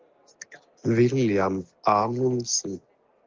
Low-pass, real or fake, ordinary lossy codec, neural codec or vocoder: 7.2 kHz; real; Opus, 32 kbps; none